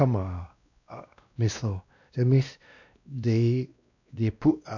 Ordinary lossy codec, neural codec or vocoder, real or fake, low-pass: none; codec, 16 kHz, 1 kbps, X-Codec, WavLM features, trained on Multilingual LibriSpeech; fake; 7.2 kHz